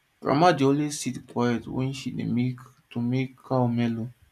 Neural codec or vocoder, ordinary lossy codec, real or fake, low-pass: none; none; real; 14.4 kHz